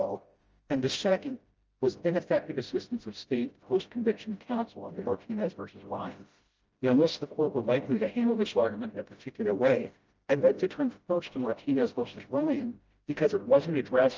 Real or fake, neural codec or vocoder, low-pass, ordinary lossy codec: fake; codec, 16 kHz, 0.5 kbps, FreqCodec, smaller model; 7.2 kHz; Opus, 24 kbps